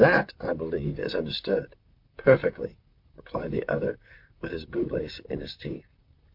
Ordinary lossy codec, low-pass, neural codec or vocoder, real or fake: MP3, 48 kbps; 5.4 kHz; vocoder, 44.1 kHz, 128 mel bands, Pupu-Vocoder; fake